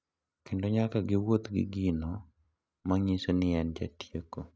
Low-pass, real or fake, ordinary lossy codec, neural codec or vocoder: none; real; none; none